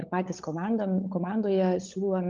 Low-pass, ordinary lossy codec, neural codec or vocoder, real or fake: 7.2 kHz; Opus, 24 kbps; codec, 16 kHz, 16 kbps, FunCodec, trained on LibriTTS, 50 frames a second; fake